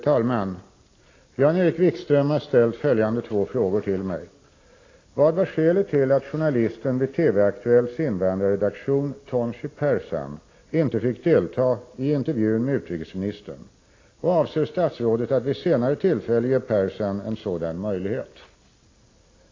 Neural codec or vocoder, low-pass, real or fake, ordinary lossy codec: none; 7.2 kHz; real; AAC, 32 kbps